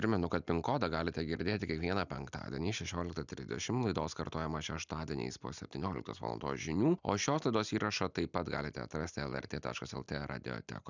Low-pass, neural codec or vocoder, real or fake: 7.2 kHz; none; real